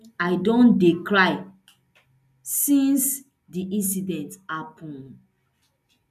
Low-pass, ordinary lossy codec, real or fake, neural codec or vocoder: 14.4 kHz; none; real; none